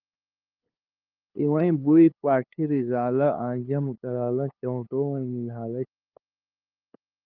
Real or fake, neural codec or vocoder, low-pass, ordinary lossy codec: fake; codec, 16 kHz, 8 kbps, FunCodec, trained on LibriTTS, 25 frames a second; 5.4 kHz; Opus, 24 kbps